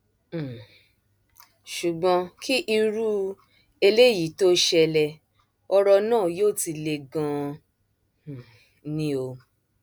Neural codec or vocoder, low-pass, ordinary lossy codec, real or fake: none; none; none; real